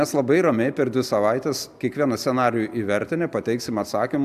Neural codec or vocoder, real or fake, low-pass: none; real; 14.4 kHz